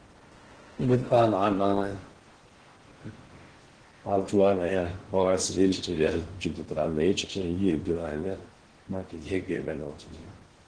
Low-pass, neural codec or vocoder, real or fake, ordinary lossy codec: 9.9 kHz; codec, 16 kHz in and 24 kHz out, 0.6 kbps, FocalCodec, streaming, 4096 codes; fake; Opus, 16 kbps